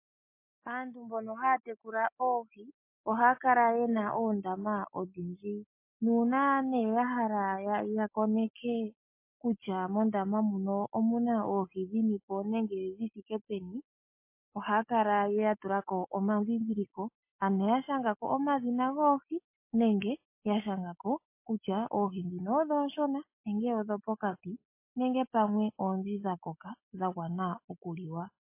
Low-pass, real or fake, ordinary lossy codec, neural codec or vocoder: 3.6 kHz; real; MP3, 24 kbps; none